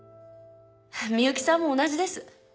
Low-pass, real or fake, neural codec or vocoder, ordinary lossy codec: none; real; none; none